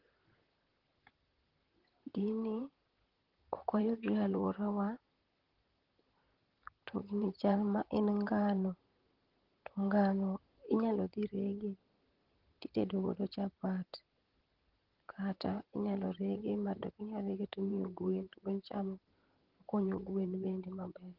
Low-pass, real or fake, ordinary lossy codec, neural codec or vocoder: 5.4 kHz; fake; Opus, 16 kbps; vocoder, 44.1 kHz, 128 mel bands, Pupu-Vocoder